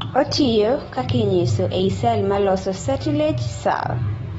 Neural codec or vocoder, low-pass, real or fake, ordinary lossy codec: none; 14.4 kHz; real; AAC, 24 kbps